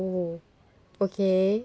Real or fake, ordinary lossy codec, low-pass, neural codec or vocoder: real; none; none; none